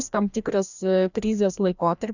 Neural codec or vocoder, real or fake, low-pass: codec, 16 kHz, 1 kbps, FreqCodec, larger model; fake; 7.2 kHz